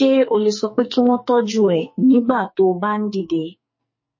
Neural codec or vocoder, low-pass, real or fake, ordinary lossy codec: codec, 44.1 kHz, 2.6 kbps, SNAC; 7.2 kHz; fake; MP3, 32 kbps